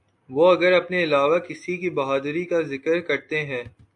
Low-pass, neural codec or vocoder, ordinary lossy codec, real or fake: 10.8 kHz; none; AAC, 64 kbps; real